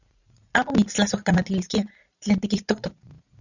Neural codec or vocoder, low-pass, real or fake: vocoder, 44.1 kHz, 80 mel bands, Vocos; 7.2 kHz; fake